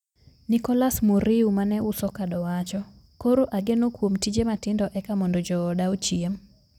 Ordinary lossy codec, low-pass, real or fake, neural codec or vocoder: none; 19.8 kHz; real; none